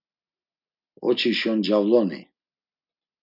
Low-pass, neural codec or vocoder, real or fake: 5.4 kHz; none; real